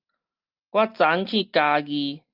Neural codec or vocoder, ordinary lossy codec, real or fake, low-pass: none; Opus, 32 kbps; real; 5.4 kHz